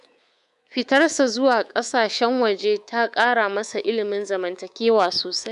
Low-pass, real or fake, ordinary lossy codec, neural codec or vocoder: 10.8 kHz; fake; none; codec, 24 kHz, 3.1 kbps, DualCodec